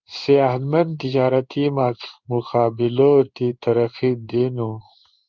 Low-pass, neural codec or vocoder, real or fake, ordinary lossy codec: 7.2 kHz; codec, 16 kHz in and 24 kHz out, 1 kbps, XY-Tokenizer; fake; Opus, 24 kbps